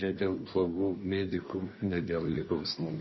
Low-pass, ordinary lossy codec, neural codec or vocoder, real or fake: 7.2 kHz; MP3, 24 kbps; codec, 24 kHz, 1 kbps, SNAC; fake